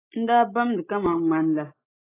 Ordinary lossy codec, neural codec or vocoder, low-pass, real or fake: AAC, 16 kbps; none; 3.6 kHz; real